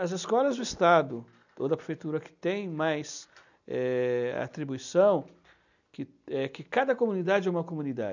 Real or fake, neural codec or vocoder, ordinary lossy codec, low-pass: real; none; none; 7.2 kHz